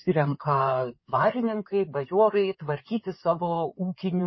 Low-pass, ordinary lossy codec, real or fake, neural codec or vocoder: 7.2 kHz; MP3, 24 kbps; fake; codec, 16 kHz in and 24 kHz out, 2.2 kbps, FireRedTTS-2 codec